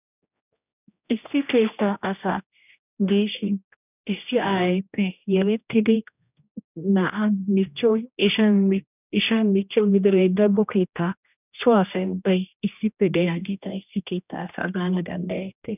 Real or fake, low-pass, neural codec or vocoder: fake; 3.6 kHz; codec, 16 kHz, 1 kbps, X-Codec, HuBERT features, trained on general audio